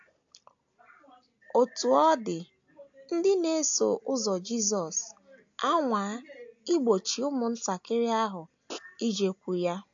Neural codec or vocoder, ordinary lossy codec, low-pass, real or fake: none; AAC, 64 kbps; 7.2 kHz; real